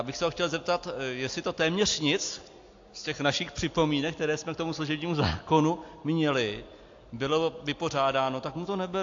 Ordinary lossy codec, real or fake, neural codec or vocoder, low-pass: AAC, 48 kbps; real; none; 7.2 kHz